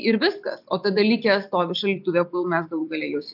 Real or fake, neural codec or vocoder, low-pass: real; none; 5.4 kHz